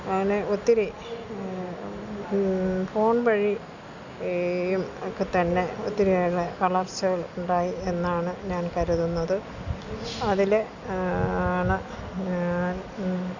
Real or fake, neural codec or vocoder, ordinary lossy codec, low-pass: real; none; none; 7.2 kHz